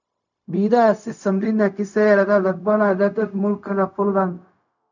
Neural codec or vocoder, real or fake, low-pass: codec, 16 kHz, 0.4 kbps, LongCat-Audio-Codec; fake; 7.2 kHz